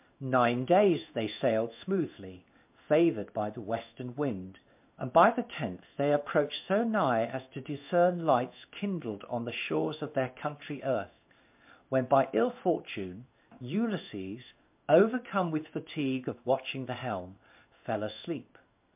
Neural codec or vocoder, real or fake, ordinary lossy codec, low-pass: codec, 16 kHz in and 24 kHz out, 1 kbps, XY-Tokenizer; fake; MP3, 32 kbps; 3.6 kHz